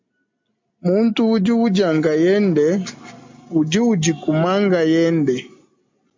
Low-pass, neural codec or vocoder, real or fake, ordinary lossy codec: 7.2 kHz; none; real; MP3, 64 kbps